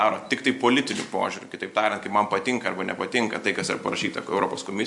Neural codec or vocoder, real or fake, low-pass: none; real; 10.8 kHz